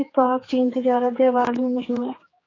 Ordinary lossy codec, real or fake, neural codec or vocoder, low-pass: AAC, 32 kbps; fake; codec, 16 kHz, 4 kbps, X-Codec, HuBERT features, trained on general audio; 7.2 kHz